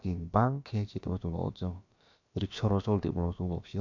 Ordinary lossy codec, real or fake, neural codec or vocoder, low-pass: none; fake; codec, 16 kHz, about 1 kbps, DyCAST, with the encoder's durations; 7.2 kHz